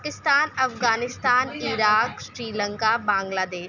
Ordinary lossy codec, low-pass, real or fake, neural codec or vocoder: none; 7.2 kHz; real; none